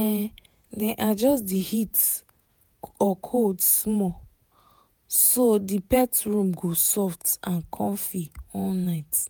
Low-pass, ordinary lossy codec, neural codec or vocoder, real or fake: none; none; vocoder, 48 kHz, 128 mel bands, Vocos; fake